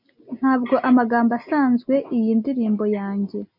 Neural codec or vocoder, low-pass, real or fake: none; 5.4 kHz; real